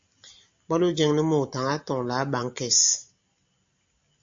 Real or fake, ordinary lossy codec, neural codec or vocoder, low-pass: real; MP3, 48 kbps; none; 7.2 kHz